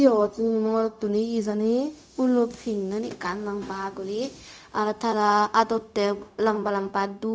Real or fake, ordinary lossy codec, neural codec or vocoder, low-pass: fake; none; codec, 16 kHz, 0.4 kbps, LongCat-Audio-Codec; none